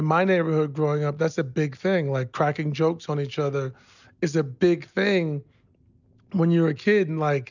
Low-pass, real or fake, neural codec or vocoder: 7.2 kHz; real; none